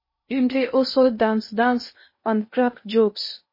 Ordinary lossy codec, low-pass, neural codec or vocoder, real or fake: MP3, 24 kbps; 5.4 kHz; codec, 16 kHz in and 24 kHz out, 0.6 kbps, FocalCodec, streaming, 2048 codes; fake